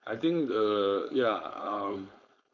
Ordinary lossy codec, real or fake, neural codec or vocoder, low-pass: none; fake; codec, 16 kHz, 4.8 kbps, FACodec; 7.2 kHz